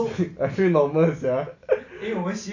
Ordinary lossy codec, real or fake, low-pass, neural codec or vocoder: AAC, 48 kbps; real; 7.2 kHz; none